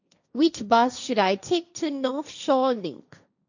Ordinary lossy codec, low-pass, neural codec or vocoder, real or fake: none; 7.2 kHz; codec, 16 kHz, 1.1 kbps, Voila-Tokenizer; fake